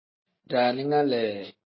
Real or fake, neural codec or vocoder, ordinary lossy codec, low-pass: real; none; MP3, 24 kbps; 7.2 kHz